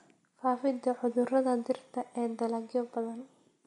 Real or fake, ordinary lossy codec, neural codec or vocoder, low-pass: real; MP3, 64 kbps; none; 10.8 kHz